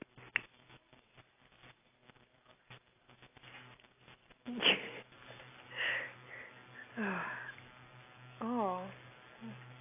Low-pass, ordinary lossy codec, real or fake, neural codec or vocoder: 3.6 kHz; AAC, 24 kbps; real; none